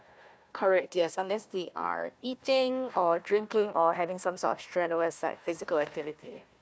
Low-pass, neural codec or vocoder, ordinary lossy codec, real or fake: none; codec, 16 kHz, 1 kbps, FunCodec, trained on Chinese and English, 50 frames a second; none; fake